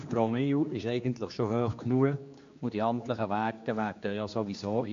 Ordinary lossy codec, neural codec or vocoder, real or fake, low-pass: MP3, 48 kbps; codec, 16 kHz, 2 kbps, X-Codec, HuBERT features, trained on general audio; fake; 7.2 kHz